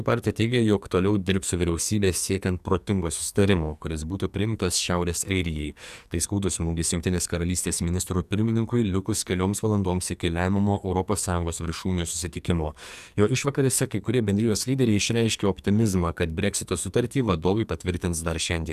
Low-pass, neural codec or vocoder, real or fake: 14.4 kHz; codec, 44.1 kHz, 2.6 kbps, SNAC; fake